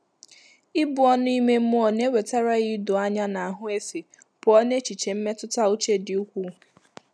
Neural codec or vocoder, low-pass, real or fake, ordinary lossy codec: none; none; real; none